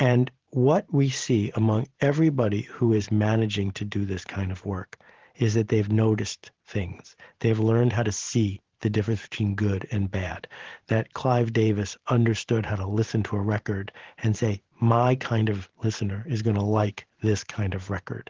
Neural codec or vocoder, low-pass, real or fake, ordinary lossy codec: vocoder, 44.1 kHz, 128 mel bands every 512 samples, BigVGAN v2; 7.2 kHz; fake; Opus, 16 kbps